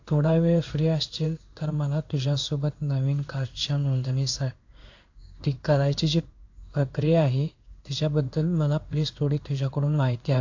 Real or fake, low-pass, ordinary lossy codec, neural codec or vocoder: fake; 7.2 kHz; AAC, 48 kbps; codec, 16 kHz in and 24 kHz out, 1 kbps, XY-Tokenizer